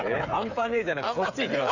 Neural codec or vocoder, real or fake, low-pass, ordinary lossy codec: codec, 16 kHz, 8 kbps, FreqCodec, smaller model; fake; 7.2 kHz; none